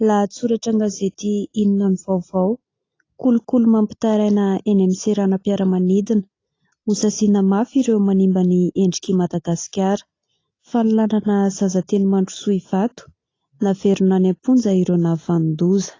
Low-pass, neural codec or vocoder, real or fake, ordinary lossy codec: 7.2 kHz; none; real; AAC, 32 kbps